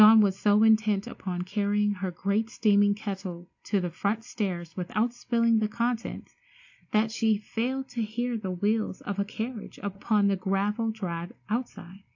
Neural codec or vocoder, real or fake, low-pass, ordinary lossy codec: none; real; 7.2 kHz; AAC, 48 kbps